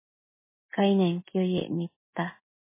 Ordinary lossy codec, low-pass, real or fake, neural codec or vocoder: MP3, 16 kbps; 3.6 kHz; real; none